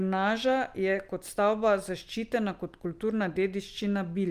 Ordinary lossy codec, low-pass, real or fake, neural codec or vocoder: Opus, 32 kbps; 14.4 kHz; real; none